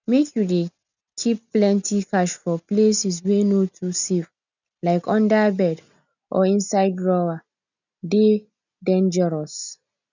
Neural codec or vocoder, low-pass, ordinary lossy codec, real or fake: none; 7.2 kHz; none; real